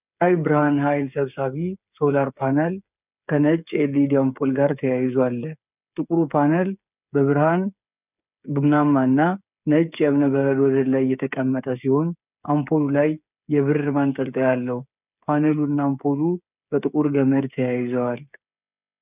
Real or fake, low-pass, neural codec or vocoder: fake; 3.6 kHz; codec, 16 kHz, 8 kbps, FreqCodec, smaller model